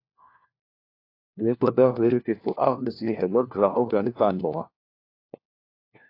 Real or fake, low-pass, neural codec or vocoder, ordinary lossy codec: fake; 5.4 kHz; codec, 16 kHz, 1 kbps, FunCodec, trained on LibriTTS, 50 frames a second; AAC, 32 kbps